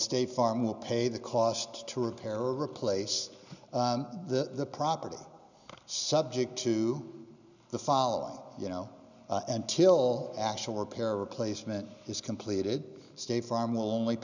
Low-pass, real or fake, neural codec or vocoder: 7.2 kHz; real; none